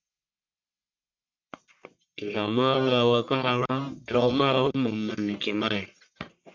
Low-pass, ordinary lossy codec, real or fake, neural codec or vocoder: 7.2 kHz; MP3, 48 kbps; fake; codec, 44.1 kHz, 1.7 kbps, Pupu-Codec